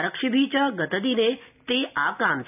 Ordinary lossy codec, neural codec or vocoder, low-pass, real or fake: none; none; 3.6 kHz; real